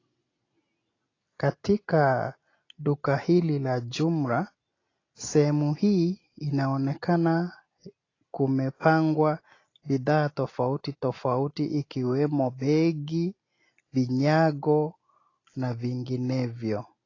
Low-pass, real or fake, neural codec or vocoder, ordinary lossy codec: 7.2 kHz; real; none; AAC, 32 kbps